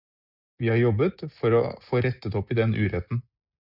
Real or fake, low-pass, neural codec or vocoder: real; 5.4 kHz; none